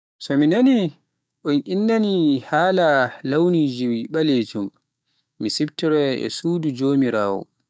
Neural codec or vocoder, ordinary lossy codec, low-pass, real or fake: codec, 16 kHz, 6 kbps, DAC; none; none; fake